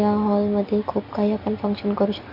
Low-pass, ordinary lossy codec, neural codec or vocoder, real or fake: 5.4 kHz; MP3, 32 kbps; none; real